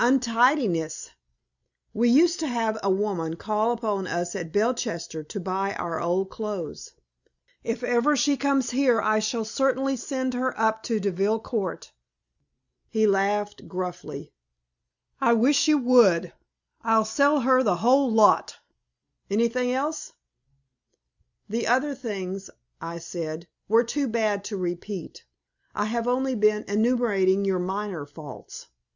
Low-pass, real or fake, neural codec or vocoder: 7.2 kHz; real; none